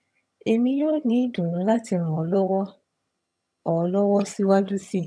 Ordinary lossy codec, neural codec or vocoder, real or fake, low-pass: none; vocoder, 22.05 kHz, 80 mel bands, HiFi-GAN; fake; none